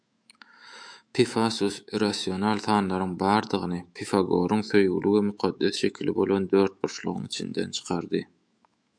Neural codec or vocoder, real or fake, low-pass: autoencoder, 48 kHz, 128 numbers a frame, DAC-VAE, trained on Japanese speech; fake; 9.9 kHz